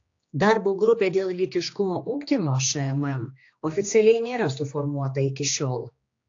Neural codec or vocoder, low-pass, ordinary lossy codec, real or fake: codec, 16 kHz, 2 kbps, X-Codec, HuBERT features, trained on general audio; 7.2 kHz; AAC, 48 kbps; fake